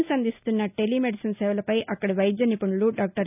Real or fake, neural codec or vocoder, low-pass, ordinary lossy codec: real; none; 3.6 kHz; none